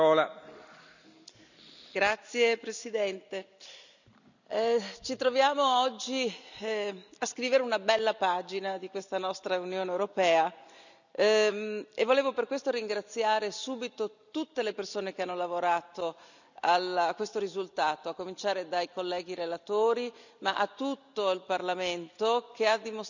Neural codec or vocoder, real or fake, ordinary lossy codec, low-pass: none; real; none; 7.2 kHz